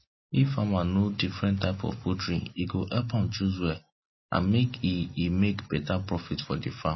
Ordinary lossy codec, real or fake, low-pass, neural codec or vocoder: MP3, 24 kbps; real; 7.2 kHz; none